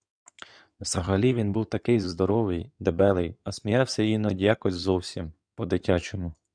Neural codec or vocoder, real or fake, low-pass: codec, 16 kHz in and 24 kHz out, 2.2 kbps, FireRedTTS-2 codec; fake; 9.9 kHz